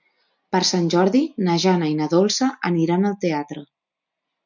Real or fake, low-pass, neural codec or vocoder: real; 7.2 kHz; none